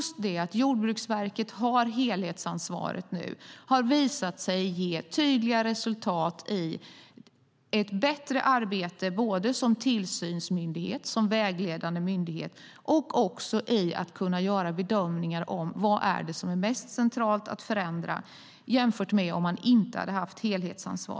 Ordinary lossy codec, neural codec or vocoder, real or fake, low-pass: none; none; real; none